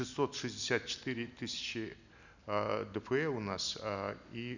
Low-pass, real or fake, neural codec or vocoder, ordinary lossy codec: 7.2 kHz; real; none; none